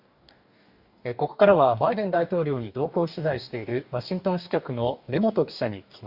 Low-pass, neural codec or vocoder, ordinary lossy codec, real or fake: 5.4 kHz; codec, 44.1 kHz, 2.6 kbps, DAC; Opus, 64 kbps; fake